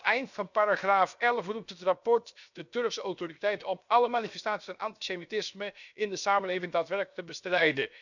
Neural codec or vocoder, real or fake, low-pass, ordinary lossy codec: codec, 16 kHz, 0.7 kbps, FocalCodec; fake; 7.2 kHz; none